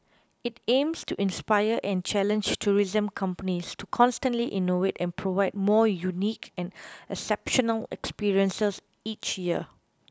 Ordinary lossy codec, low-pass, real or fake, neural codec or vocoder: none; none; real; none